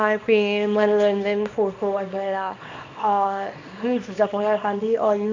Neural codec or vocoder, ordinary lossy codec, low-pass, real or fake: codec, 24 kHz, 0.9 kbps, WavTokenizer, small release; MP3, 48 kbps; 7.2 kHz; fake